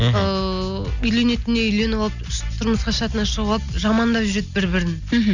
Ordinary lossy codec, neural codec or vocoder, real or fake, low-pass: none; none; real; 7.2 kHz